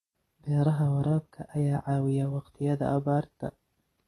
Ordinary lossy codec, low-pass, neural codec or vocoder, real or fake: AAC, 32 kbps; 19.8 kHz; none; real